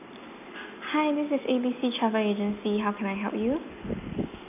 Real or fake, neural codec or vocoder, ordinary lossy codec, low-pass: real; none; none; 3.6 kHz